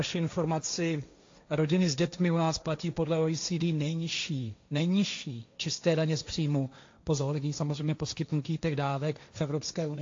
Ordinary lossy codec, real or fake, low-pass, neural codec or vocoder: AAC, 48 kbps; fake; 7.2 kHz; codec, 16 kHz, 1.1 kbps, Voila-Tokenizer